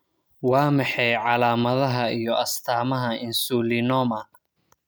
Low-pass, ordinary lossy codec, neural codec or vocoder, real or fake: none; none; none; real